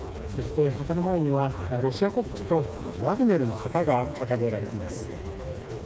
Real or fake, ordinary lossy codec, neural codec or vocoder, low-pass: fake; none; codec, 16 kHz, 2 kbps, FreqCodec, smaller model; none